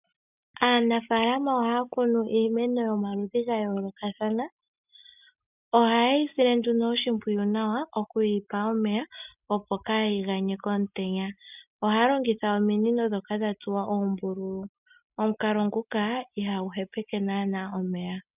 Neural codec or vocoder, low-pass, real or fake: none; 3.6 kHz; real